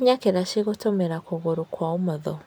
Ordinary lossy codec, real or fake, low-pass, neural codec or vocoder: none; real; none; none